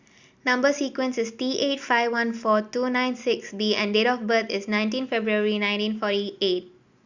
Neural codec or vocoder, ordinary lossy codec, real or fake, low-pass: none; Opus, 64 kbps; real; 7.2 kHz